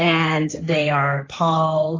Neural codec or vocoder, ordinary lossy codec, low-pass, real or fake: codec, 16 kHz, 1.1 kbps, Voila-Tokenizer; AAC, 32 kbps; 7.2 kHz; fake